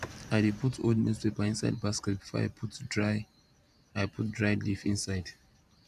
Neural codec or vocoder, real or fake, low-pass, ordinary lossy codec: vocoder, 44.1 kHz, 128 mel bands every 512 samples, BigVGAN v2; fake; 14.4 kHz; none